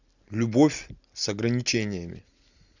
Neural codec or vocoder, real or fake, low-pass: none; real; 7.2 kHz